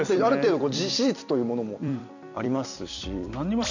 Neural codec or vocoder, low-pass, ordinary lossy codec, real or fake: vocoder, 44.1 kHz, 128 mel bands every 256 samples, BigVGAN v2; 7.2 kHz; none; fake